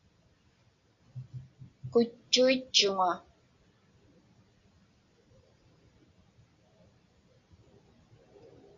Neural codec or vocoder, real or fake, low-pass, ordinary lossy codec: none; real; 7.2 kHz; AAC, 32 kbps